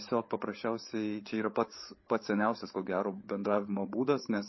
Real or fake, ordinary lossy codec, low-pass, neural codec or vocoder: fake; MP3, 24 kbps; 7.2 kHz; codec, 16 kHz, 8 kbps, FreqCodec, larger model